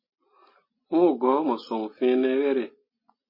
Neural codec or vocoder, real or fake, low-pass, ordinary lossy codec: none; real; 5.4 kHz; MP3, 24 kbps